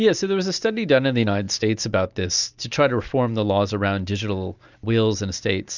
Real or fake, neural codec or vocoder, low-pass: real; none; 7.2 kHz